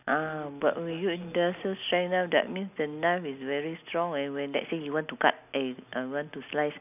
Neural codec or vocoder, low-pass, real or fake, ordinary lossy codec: none; 3.6 kHz; real; none